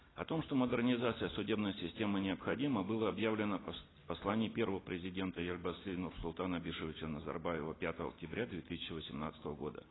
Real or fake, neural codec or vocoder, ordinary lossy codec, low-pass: real; none; AAC, 16 kbps; 7.2 kHz